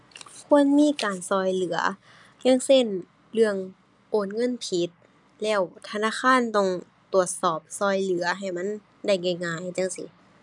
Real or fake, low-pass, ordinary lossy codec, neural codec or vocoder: real; 10.8 kHz; none; none